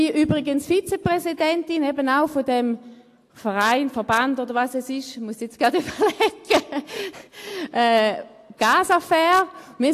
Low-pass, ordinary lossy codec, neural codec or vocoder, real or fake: 14.4 kHz; AAC, 64 kbps; none; real